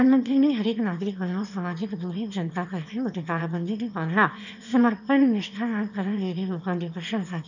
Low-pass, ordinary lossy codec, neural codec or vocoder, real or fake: 7.2 kHz; none; autoencoder, 22.05 kHz, a latent of 192 numbers a frame, VITS, trained on one speaker; fake